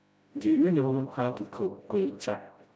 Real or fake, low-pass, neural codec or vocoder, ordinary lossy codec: fake; none; codec, 16 kHz, 0.5 kbps, FreqCodec, smaller model; none